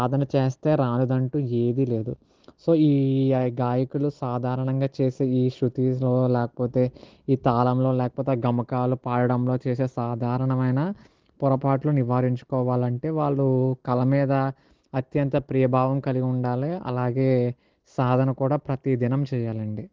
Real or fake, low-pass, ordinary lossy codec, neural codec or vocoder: real; 7.2 kHz; Opus, 16 kbps; none